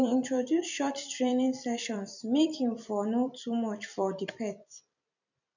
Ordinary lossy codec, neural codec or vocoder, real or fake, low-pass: none; vocoder, 44.1 kHz, 128 mel bands every 256 samples, BigVGAN v2; fake; 7.2 kHz